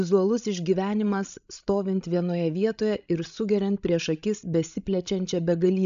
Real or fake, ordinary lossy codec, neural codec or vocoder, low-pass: fake; AAC, 96 kbps; codec, 16 kHz, 16 kbps, FreqCodec, larger model; 7.2 kHz